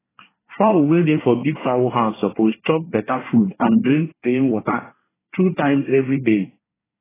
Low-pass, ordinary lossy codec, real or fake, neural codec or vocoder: 3.6 kHz; AAC, 16 kbps; fake; codec, 24 kHz, 1 kbps, SNAC